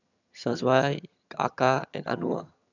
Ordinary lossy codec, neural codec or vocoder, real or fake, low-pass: none; vocoder, 22.05 kHz, 80 mel bands, HiFi-GAN; fake; 7.2 kHz